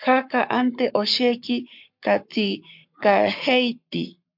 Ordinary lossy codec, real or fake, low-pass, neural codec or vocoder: MP3, 48 kbps; fake; 5.4 kHz; codec, 44.1 kHz, 7.8 kbps, Pupu-Codec